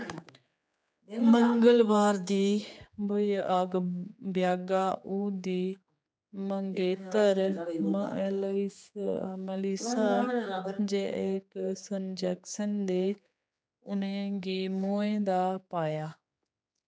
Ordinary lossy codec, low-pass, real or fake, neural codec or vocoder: none; none; fake; codec, 16 kHz, 4 kbps, X-Codec, HuBERT features, trained on general audio